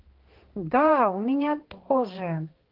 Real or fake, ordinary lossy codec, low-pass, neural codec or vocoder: fake; Opus, 16 kbps; 5.4 kHz; codec, 16 kHz, 2 kbps, X-Codec, HuBERT features, trained on balanced general audio